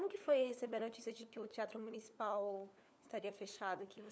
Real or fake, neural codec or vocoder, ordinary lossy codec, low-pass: fake; codec, 16 kHz, 4 kbps, FunCodec, trained on LibriTTS, 50 frames a second; none; none